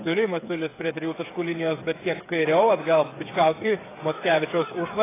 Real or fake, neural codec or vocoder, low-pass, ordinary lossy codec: fake; codec, 16 kHz, 8 kbps, FreqCodec, smaller model; 3.6 kHz; AAC, 16 kbps